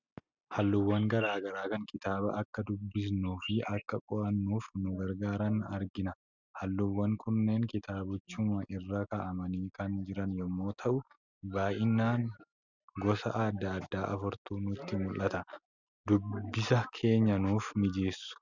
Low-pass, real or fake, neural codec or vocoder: 7.2 kHz; real; none